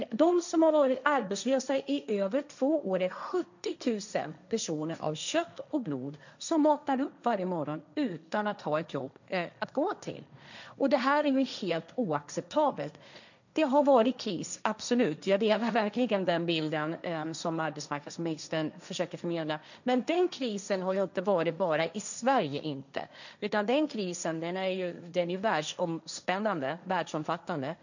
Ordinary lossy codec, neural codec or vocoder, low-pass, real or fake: none; codec, 16 kHz, 1.1 kbps, Voila-Tokenizer; 7.2 kHz; fake